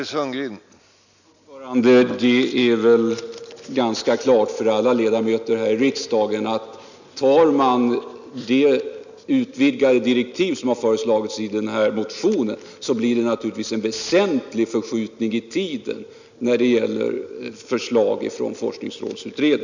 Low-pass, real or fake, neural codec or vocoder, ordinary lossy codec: 7.2 kHz; real; none; none